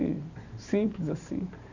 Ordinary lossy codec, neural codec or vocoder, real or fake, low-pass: none; vocoder, 44.1 kHz, 128 mel bands every 512 samples, BigVGAN v2; fake; 7.2 kHz